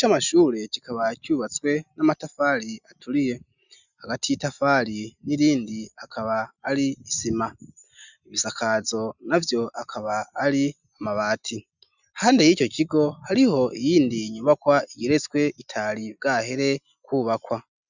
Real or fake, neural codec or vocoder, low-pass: real; none; 7.2 kHz